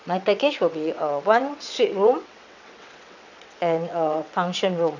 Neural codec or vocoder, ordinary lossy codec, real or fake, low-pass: vocoder, 22.05 kHz, 80 mel bands, WaveNeXt; none; fake; 7.2 kHz